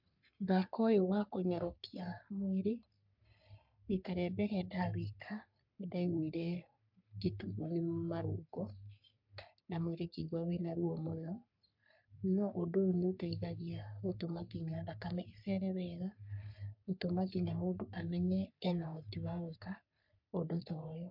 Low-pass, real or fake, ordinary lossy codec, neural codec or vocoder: 5.4 kHz; fake; MP3, 48 kbps; codec, 44.1 kHz, 3.4 kbps, Pupu-Codec